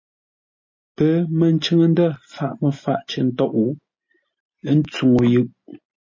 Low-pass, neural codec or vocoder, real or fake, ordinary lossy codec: 7.2 kHz; none; real; MP3, 32 kbps